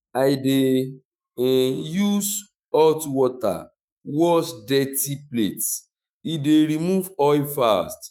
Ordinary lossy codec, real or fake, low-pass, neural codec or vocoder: none; fake; none; autoencoder, 48 kHz, 128 numbers a frame, DAC-VAE, trained on Japanese speech